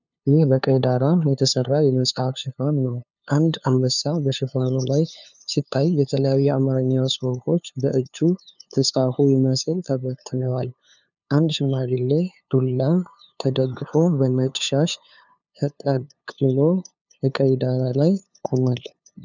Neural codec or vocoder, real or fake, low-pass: codec, 16 kHz, 2 kbps, FunCodec, trained on LibriTTS, 25 frames a second; fake; 7.2 kHz